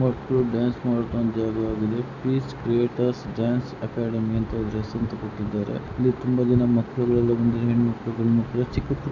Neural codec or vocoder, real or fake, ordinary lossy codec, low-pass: none; real; none; 7.2 kHz